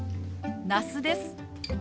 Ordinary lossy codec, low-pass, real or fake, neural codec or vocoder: none; none; real; none